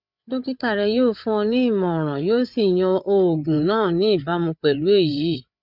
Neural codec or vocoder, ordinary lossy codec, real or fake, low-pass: codec, 16 kHz, 8 kbps, FreqCodec, larger model; none; fake; 5.4 kHz